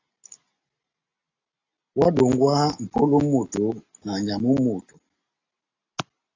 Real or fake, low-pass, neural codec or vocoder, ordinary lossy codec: real; 7.2 kHz; none; AAC, 32 kbps